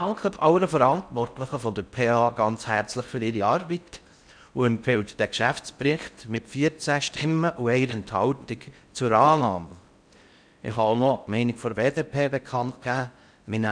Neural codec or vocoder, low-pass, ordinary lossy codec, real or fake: codec, 16 kHz in and 24 kHz out, 0.6 kbps, FocalCodec, streaming, 4096 codes; 9.9 kHz; none; fake